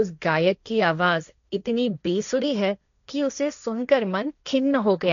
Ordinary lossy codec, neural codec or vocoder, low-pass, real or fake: none; codec, 16 kHz, 1.1 kbps, Voila-Tokenizer; 7.2 kHz; fake